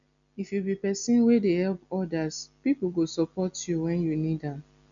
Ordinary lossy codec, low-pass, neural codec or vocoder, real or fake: none; 7.2 kHz; none; real